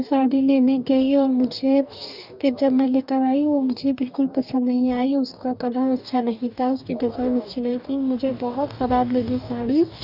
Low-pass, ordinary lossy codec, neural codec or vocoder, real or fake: 5.4 kHz; none; codec, 44.1 kHz, 2.6 kbps, DAC; fake